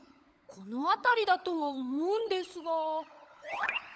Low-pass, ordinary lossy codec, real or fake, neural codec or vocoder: none; none; fake; codec, 16 kHz, 16 kbps, FunCodec, trained on LibriTTS, 50 frames a second